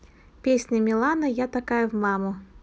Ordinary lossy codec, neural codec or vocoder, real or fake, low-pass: none; none; real; none